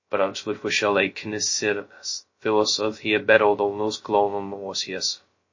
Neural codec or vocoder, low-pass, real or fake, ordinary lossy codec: codec, 16 kHz, 0.2 kbps, FocalCodec; 7.2 kHz; fake; MP3, 32 kbps